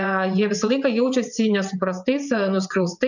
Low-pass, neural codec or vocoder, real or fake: 7.2 kHz; vocoder, 22.05 kHz, 80 mel bands, WaveNeXt; fake